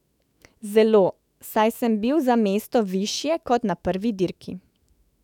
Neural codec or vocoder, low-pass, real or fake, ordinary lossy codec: autoencoder, 48 kHz, 128 numbers a frame, DAC-VAE, trained on Japanese speech; 19.8 kHz; fake; none